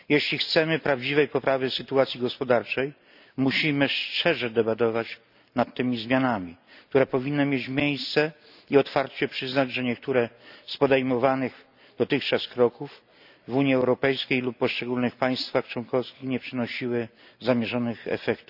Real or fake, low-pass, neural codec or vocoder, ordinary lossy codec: real; 5.4 kHz; none; none